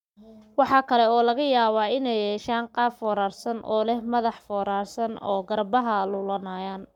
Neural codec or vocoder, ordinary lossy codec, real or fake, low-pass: codec, 44.1 kHz, 7.8 kbps, Pupu-Codec; none; fake; 19.8 kHz